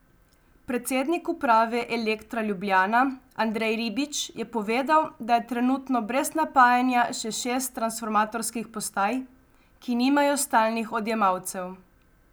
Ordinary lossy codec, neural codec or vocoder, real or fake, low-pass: none; none; real; none